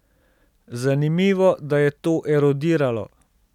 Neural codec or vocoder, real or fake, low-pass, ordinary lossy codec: none; real; 19.8 kHz; none